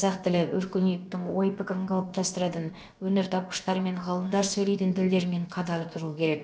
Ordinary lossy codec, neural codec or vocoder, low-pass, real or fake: none; codec, 16 kHz, about 1 kbps, DyCAST, with the encoder's durations; none; fake